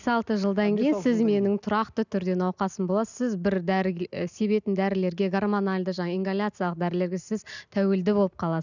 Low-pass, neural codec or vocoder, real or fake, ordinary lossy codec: 7.2 kHz; none; real; none